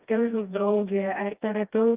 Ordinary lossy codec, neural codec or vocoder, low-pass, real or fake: Opus, 24 kbps; codec, 16 kHz, 1 kbps, FreqCodec, smaller model; 3.6 kHz; fake